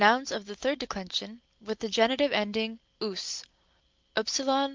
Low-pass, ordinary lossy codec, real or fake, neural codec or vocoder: 7.2 kHz; Opus, 32 kbps; real; none